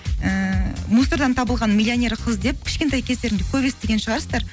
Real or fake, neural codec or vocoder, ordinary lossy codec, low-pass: real; none; none; none